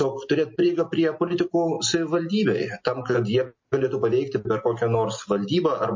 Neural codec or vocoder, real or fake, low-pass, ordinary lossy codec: none; real; 7.2 kHz; MP3, 32 kbps